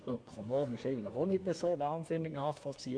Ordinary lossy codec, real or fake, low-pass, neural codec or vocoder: none; fake; 9.9 kHz; codec, 24 kHz, 1 kbps, SNAC